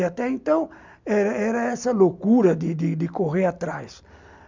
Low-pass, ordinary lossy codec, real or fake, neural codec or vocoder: 7.2 kHz; MP3, 64 kbps; real; none